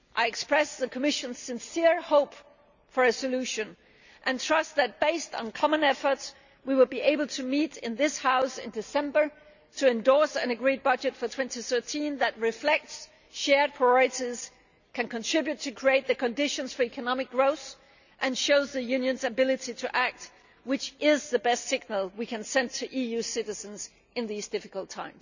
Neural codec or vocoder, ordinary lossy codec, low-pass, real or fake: none; none; 7.2 kHz; real